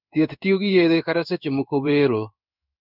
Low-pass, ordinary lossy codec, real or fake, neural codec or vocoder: 5.4 kHz; none; fake; codec, 16 kHz in and 24 kHz out, 1 kbps, XY-Tokenizer